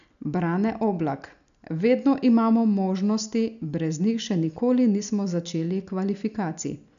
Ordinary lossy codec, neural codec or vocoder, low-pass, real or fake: none; none; 7.2 kHz; real